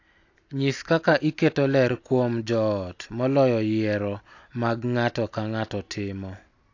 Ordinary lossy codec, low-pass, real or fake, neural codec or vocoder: AAC, 48 kbps; 7.2 kHz; real; none